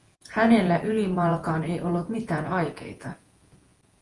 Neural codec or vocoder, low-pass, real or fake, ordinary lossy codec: vocoder, 48 kHz, 128 mel bands, Vocos; 10.8 kHz; fake; Opus, 24 kbps